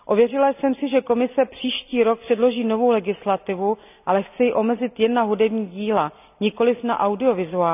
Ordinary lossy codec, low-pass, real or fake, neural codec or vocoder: none; 3.6 kHz; real; none